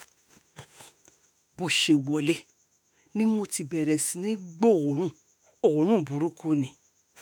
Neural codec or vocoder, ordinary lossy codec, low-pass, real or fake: autoencoder, 48 kHz, 32 numbers a frame, DAC-VAE, trained on Japanese speech; none; none; fake